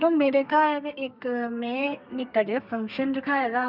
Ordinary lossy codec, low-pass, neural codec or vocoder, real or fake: none; 5.4 kHz; codec, 32 kHz, 1.9 kbps, SNAC; fake